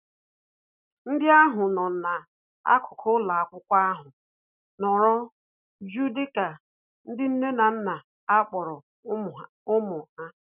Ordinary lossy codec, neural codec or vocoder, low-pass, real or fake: none; none; 3.6 kHz; real